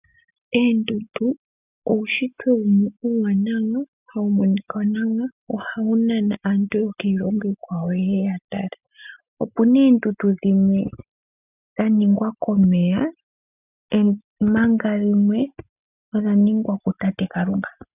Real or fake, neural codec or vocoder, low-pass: real; none; 3.6 kHz